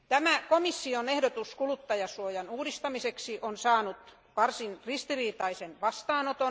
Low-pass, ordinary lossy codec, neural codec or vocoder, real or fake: none; none; none; real